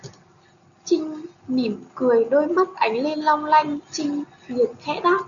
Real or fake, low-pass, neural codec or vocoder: real; 7.2 kHz; none